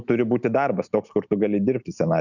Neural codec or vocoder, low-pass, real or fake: none; 7.2 kHz; real